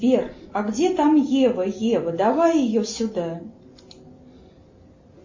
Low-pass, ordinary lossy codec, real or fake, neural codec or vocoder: 7.2 kHz; MP3, 32 kbps; real; none